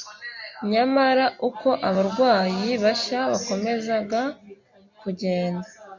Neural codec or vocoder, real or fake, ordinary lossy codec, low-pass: none; real; MP3, 48 kbps; 7.2 kHz